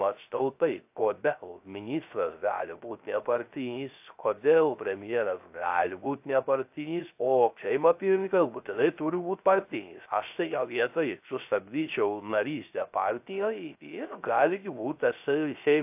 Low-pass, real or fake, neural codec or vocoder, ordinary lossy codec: 3.6 kHz; fake; codec, 16 kHz, 0.3 kbps, FocalCodec; AAC, 32 kbps